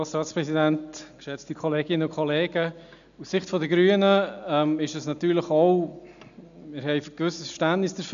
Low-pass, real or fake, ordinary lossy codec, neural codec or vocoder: 7.2 kHz; real; none; none